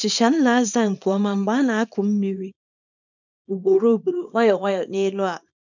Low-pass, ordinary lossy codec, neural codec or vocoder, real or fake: 7.2 kHz; none; codec, 24 kHz, 0.9 kbps, WavTokenizer, small release; fake